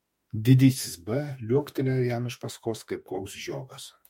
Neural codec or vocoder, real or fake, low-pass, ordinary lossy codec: autoencoder, 48 kHz, 32 numbers a frame, DAC-VAE, trained on Japanese speech; fake; 19.8 kHz; MP3, 64 kbps